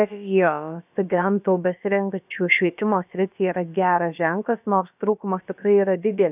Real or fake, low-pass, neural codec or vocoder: fake; 3.6 kHz; codec, 16 kHz, about 1 kbps, DyCAST, with the encoder's durations